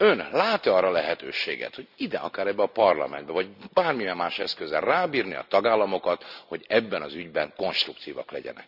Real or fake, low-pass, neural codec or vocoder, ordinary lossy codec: real; 5.4 kHz; none; none